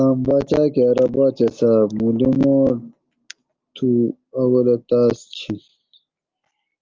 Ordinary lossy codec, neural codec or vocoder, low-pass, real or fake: Opus, 32 kbps; none; 7.2 kHz; real